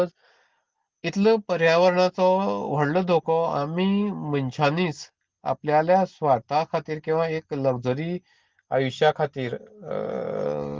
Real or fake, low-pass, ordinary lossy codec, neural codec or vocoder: real; 7.2 kHz; Opus, 16 kbps; none